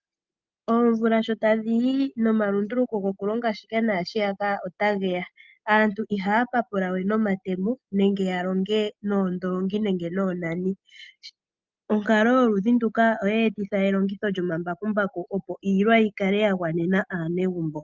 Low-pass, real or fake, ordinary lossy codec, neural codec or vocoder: 7.2 kHz; real; Opus, 32 kbps; none